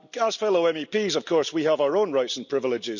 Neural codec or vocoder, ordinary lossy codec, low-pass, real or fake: none; none; 7.2 kHz; real